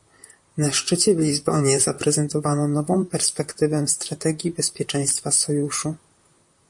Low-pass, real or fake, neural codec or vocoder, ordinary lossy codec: 10.8 kHz; fake; vocoder, 44.1 kHz, 128 mel bands, Pupu-Vocoder; MP3, 48 kbps